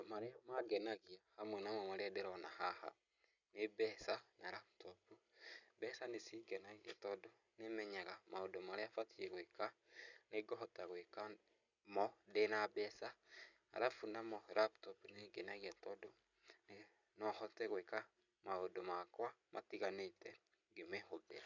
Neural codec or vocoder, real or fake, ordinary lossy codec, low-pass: none; real; none; 7.2 kHz